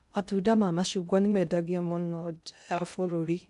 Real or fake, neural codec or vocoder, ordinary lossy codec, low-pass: fake; codec, 16 kHz in and 24 kHz out, 0.6 kbps, FocalCodec, streaming, 2048 codes; none; 10.8 kHz